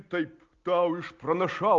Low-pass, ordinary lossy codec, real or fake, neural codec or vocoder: 7.2 kHz; Opus, 32 kbps; real; none